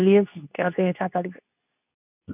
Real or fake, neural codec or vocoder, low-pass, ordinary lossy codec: fake; codec, 24 kHz, 0.9 kbps, WavTokenizer, medium speech release version 2; 3.6 kHz; none